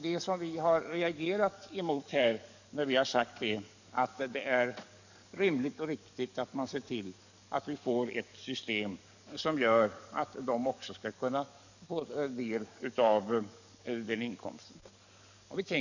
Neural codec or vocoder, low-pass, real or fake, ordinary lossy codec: codec, 44.1 kHz, 7.8 kbps, DAC; 7.2 kHz; fake; none